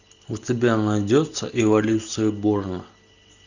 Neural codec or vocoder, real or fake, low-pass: none; real; 7.2 kHz